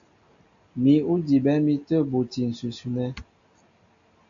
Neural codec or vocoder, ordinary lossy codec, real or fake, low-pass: none; AAC, 64 kbps; real; 7.2 kHz